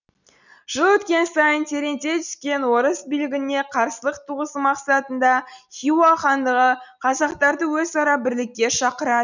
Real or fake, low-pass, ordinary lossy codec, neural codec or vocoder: real; 7.2 kHz; none; none